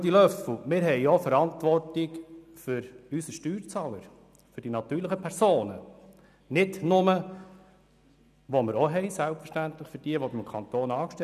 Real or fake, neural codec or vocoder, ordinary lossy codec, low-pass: real; none; none; 14.4 kHz